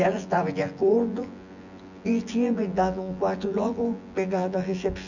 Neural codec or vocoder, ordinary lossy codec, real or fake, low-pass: vocoder, 24 kHz, 100 mel bands, Vocos; none; fake; 7.2 kHz